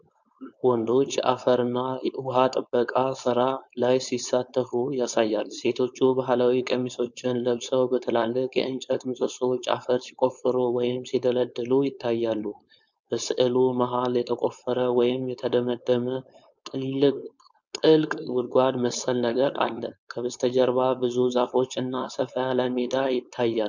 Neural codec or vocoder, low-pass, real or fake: codec, 16 kHz, 4.8 kbps, FACodec; 7.2 kHz; fake